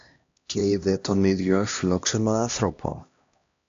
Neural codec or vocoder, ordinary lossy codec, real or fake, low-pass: codec, 16 kHz, 1 kbps, X-Codec, HuBERT features, trained on LibriSpeech; AAC, 64 kbps; fake; 7.2 kHz